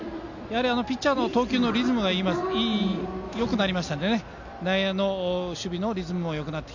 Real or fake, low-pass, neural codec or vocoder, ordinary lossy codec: real; 7.2 kHz; none; none